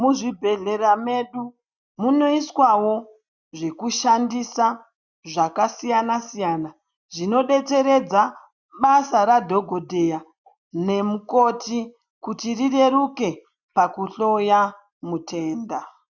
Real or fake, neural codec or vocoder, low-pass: real; none; 7.2 kHz